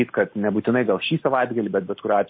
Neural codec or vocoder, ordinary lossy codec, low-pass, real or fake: none; MP3, 24 kbps; 7.2 kHz; real